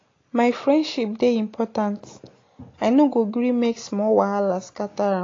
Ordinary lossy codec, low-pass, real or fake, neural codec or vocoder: AAC, 32 kbps; 7.2 kHz; real; none